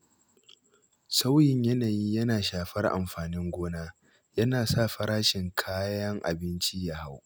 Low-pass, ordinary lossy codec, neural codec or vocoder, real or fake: none; none; none; real